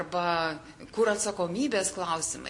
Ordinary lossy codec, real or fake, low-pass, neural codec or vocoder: MP3, 48 kbps; real; 10.8 kHz; none